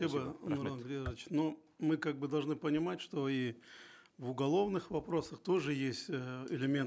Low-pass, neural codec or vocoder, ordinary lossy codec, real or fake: none; none; none; real